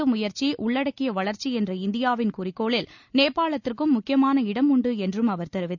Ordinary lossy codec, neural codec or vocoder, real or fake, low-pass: none; none; real; 7.2 kHz